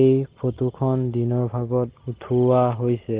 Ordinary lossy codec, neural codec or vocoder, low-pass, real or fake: Opus, 16 kbps; none; 3.6 kHz; real